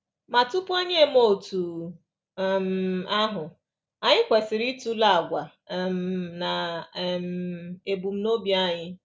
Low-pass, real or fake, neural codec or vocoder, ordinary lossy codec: none; real; none; none